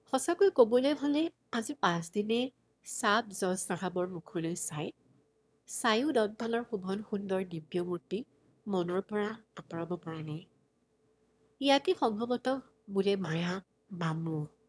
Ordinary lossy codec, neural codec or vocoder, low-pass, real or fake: none; autoencoder, 22.05 kHz, a latent of 192 numbers a frame, VITS, trained on one speaker; none; fake